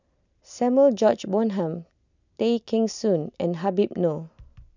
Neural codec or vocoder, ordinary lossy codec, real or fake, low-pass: none; none; real; 7.2 kHz